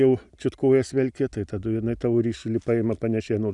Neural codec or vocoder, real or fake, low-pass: none; real; 10.8 kHz